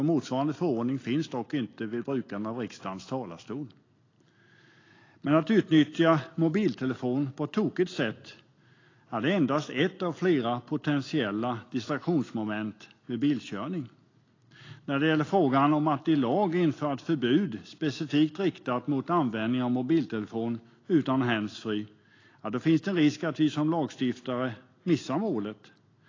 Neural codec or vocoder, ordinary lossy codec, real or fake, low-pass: none; AAC, 32 kbps; real; 7.2 kHz